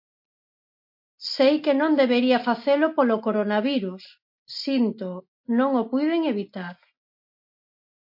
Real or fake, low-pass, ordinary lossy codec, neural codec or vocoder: real; 5.4 kHz; MP3, 32 kbps; none